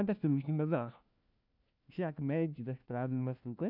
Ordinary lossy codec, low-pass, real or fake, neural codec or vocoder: none; 5.4 kHz; fake; codec, 16 kHz, 1 kbps, FunCodec, trained on LibriTTS, 50 frames a second